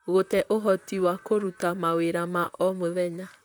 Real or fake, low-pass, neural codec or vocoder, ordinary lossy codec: fake; none; vocoder, 44.1 kHz, 128 mel bands, Pupu-Vocoder; none